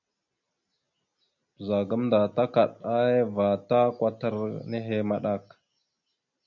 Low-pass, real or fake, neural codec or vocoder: 7.2 kHz; real; none